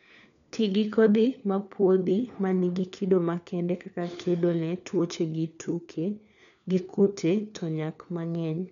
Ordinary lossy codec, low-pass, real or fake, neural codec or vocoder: none; 7.2 kHz; fake; codec, 16 kHz, 4 kbps, FunCodec, trained on LibriTTS, 50 frames a second